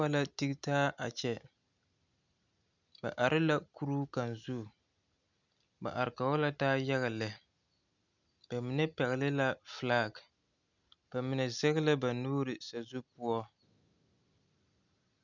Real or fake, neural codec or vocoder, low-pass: real; none; 7.2 kHz